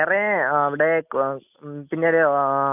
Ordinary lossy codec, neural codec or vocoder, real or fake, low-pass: none; none; real; 3.6 kHz